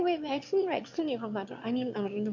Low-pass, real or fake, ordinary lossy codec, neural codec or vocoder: 7.2 kHz; fake; MP3, 48 kbps; autoencoder, 22.05 kHz, a latent of 192 numbers a frame, VITS, trained on one speaker